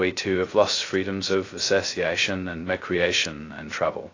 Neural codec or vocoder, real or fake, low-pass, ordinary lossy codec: codec, 16 kHz, 0.2 kbps, FocalCodec; fake; 7.2 kHz; AAC, 32 kbps